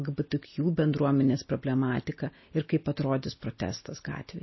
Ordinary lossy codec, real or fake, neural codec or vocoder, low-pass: MP3, 24 kbps; real; none; 7.2 kHz